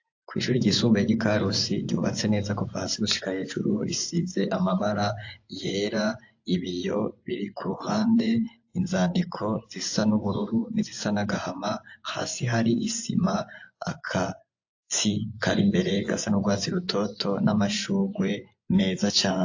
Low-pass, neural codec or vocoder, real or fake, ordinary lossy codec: 7.2 kHz; vocoder, 24 kHz, 100 mel bands, Vocos; fake; AAC, 48 kbps